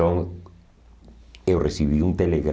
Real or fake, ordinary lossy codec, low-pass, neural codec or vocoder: real; none; none; none